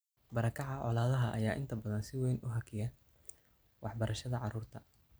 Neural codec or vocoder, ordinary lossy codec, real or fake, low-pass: none; none; real; none